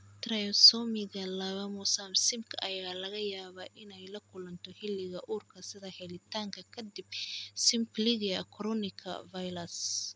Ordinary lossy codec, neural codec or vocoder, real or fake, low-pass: none; none; real; none